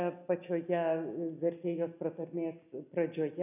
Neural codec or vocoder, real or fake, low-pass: none; real; 3.6 kHz